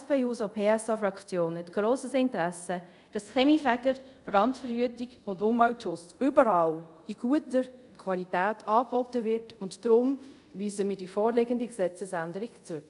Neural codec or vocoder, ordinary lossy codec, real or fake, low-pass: codec, 24 kHz, 0.5 kbps, DualCodec; none; fake; 10.8 kHz